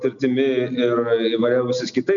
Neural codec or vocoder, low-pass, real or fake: none; 7.2 kHz; real